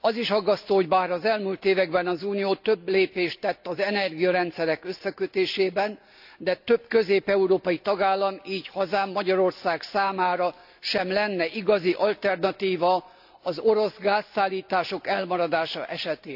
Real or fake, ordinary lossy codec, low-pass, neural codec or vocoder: real; AAC, 48 kbps; 5.4 kHz; none